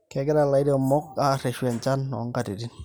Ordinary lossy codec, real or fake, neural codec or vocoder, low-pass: none; real; none; none